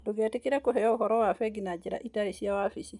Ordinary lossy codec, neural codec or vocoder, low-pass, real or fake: none; none; 10.8 kHz; real